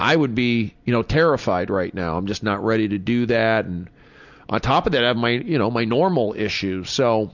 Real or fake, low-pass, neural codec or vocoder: real; 7.2 kHz; none